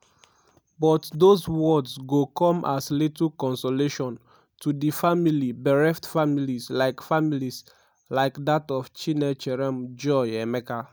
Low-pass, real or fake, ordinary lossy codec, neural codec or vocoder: none; real; none; none